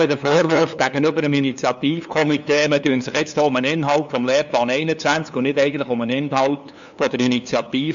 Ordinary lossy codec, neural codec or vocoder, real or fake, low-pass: MP3, 64 kbps; codec, 16 kHz, 2 kbps, FunCodec, trained on LibriTTS, 25 frames a second; fake; 7.2 kHz